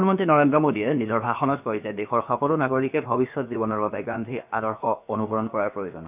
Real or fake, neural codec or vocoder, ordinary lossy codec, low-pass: fake; codec, 16 kHz, about 1 kbps, DyCAST, with the encoder's durations; none; 3.6 kHz